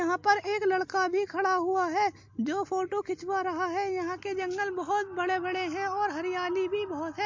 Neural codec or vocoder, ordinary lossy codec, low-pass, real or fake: none; MP3, 48 kbps; 7.2 kHz; real